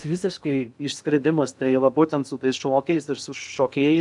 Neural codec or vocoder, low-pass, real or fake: codec, 16 kHz in and 24 kHz out, 0.6 kbps, FocalCodec, streaming, 2048 codes; 10.8 kHz; fake